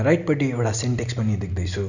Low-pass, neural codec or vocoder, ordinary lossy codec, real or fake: 7.2 kHz; none; none; real